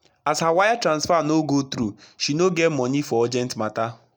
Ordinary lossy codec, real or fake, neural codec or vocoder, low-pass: none; real; none; none